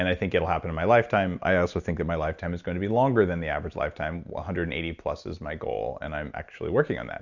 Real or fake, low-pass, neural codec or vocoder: real; 7.2 kHz; none